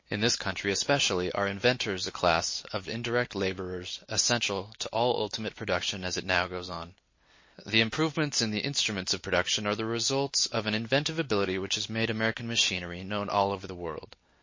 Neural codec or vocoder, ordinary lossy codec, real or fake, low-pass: none; MP3, 32 kbps; real; 7.2 kHz